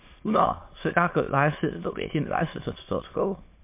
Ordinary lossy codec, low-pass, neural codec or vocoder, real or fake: MP3, 24 kbps; 3.6 kHz; autoencoder, 22.05 kHz, a latent of 192 numbers a frame, VITS, trained on many speakers; fake